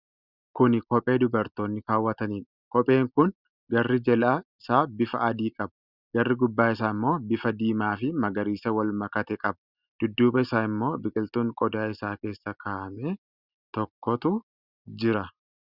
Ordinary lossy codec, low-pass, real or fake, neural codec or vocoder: Opus, 64 kbps; 5.4 kHz; real; none